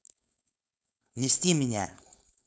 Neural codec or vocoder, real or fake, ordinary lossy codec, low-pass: codec, 16 kHz, 4.8 kbps, FACodec; fake; none; none